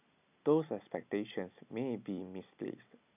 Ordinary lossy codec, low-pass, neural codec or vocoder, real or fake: none; 3.6 kHz; none; real